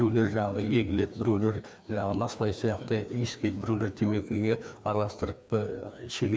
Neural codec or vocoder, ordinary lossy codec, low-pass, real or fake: codec, 16 kHz, 2 kbps, FreqCodec, larger model; none; none; fake